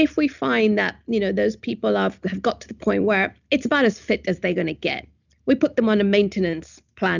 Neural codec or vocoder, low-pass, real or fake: none; 7.2 kHz; real